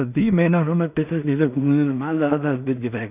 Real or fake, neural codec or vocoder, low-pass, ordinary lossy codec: fake; codec, 16 kHz in and 24 kHz out, 0.4 kbps, LongCat-Audio-Codec, two codebook decoder; 3.6 kHz; none